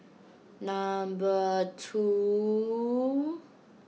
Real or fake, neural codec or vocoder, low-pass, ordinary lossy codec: real; none; none; none